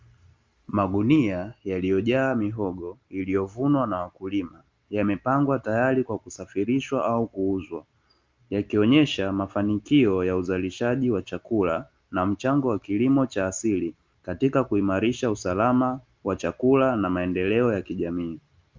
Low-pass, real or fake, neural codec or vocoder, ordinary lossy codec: 7.2 kHz; real; none; Opus, 32 kbps